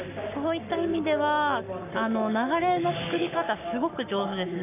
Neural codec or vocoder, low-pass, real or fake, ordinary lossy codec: codec, 44.1 kHz, 7.8 kbps, Pupu-Codec; 3.6 kHz; fake; none